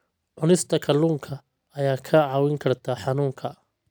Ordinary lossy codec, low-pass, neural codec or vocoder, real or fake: none; none; none; real